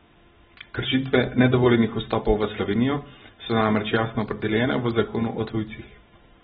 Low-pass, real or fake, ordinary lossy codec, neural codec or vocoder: 10.8 kHz; real; AAC, 16 kbps; none